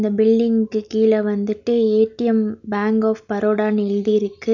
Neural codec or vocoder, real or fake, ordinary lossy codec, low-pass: none; real; none; 7.2 kHz